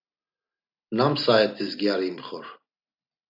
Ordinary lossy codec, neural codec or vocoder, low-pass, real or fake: AAC, 48 kbps; none; 5.4 kHz; real